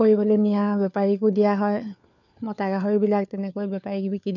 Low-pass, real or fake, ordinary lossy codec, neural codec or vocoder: 7.2 kHz; fake; none; codec, 16 kHz, 4 kbps, FunCodec, trained on LibriTTS, 50 frames a second